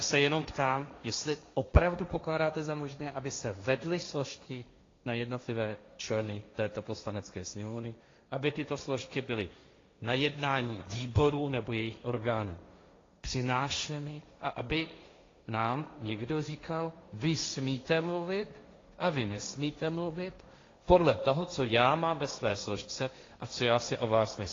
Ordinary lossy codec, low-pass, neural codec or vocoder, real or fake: AAC, 32 kbps; 7.2 kHz; codec, 16 kHz, 1.1 kbps, Voila-Tokenizer; fake